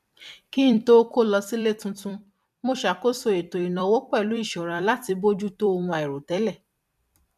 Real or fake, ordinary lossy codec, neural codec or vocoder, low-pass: fake; none; vocoder, 44.1 kHz, 128 mel bands every 256 samples, BigVGAN v2; 14.4 kHz